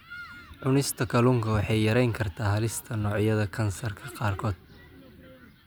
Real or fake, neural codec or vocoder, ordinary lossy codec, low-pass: real; none; none; none